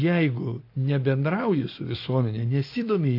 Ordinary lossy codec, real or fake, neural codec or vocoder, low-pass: AAC, 32 kbps; real; none; 5.4 kHz